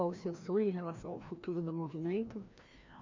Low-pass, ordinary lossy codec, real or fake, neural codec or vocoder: 7.2 kHz; MP3, 48 kbps; fake; codec, 16 kHz, 1 kbps, FreqCodec, larger model